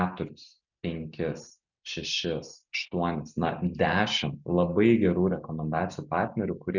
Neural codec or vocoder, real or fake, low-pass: none; real; 7.2 kHz